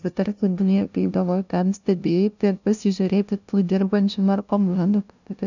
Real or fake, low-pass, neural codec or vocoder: fake; 7.2 kHz; codec, 16 kHz, 0.5 kbps, FunCodec, trained on LibriTTS, 25 frames a second